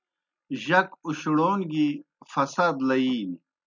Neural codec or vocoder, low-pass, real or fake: none; 7.2 kHz; real